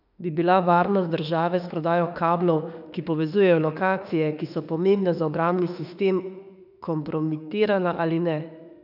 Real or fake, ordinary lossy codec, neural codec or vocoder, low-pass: fake; none; autoencoder, 48 kHz, 32 numbers a frame, DAC-VAE, trained on Japanese speech; 5.4 kHz